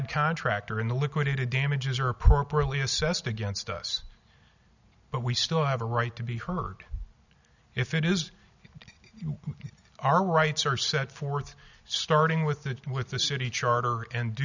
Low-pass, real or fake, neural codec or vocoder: 7.2 kHz; real; none